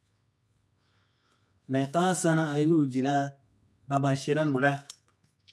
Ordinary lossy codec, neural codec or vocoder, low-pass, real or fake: none; codec, 24 kHz, 0.9 kbps, WavTokenizer, medium music audio release; none; fake